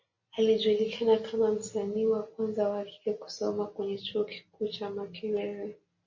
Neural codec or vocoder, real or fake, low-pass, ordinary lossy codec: none; real; 7.2 kHz; MP3, 32 kbps